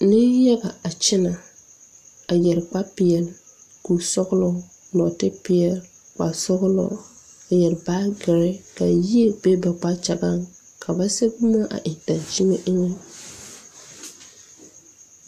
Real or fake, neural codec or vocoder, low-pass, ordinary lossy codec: real; none; 14.4 kHz; AAC, 96 kbps